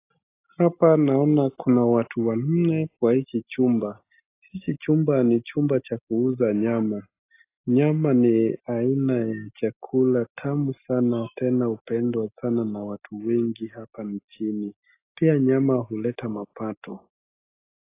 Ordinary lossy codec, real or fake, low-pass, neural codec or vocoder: AAC, 24 kbps; real; 3.6 kHz; none